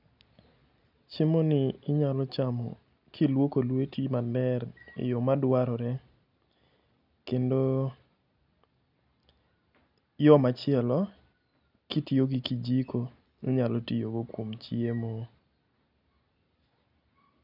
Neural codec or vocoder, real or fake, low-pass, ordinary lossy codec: none; real; 5.4 kHz; none